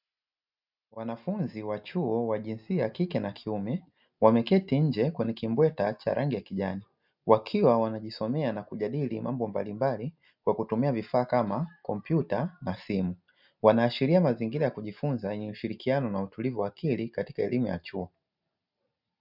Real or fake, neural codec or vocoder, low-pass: real; none; 5.4 kHz